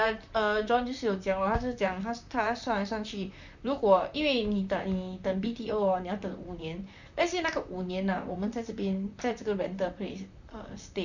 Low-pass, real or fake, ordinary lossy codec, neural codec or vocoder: 7.2 kHz; fake; none; vocoder, 44.1 kHz, 128 mel bands, Pupu-Vocoder